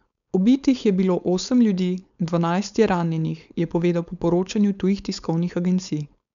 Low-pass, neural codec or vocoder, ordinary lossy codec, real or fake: 7.2 kHz; codec, 16 kHz, 4.8 kbps, FACodec; none; fake